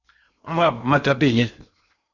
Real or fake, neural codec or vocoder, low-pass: fake; codec, 16 kHz in and 24 kHz out, 0.8 kbps, FocalCodec, streaming, 65536 codes; 7.2 kHz